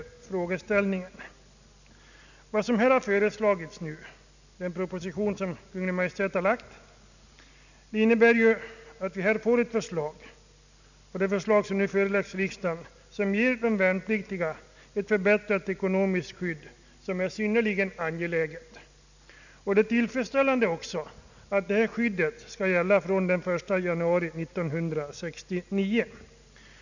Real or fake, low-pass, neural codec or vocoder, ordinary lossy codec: real; 7.2 kHz; none; none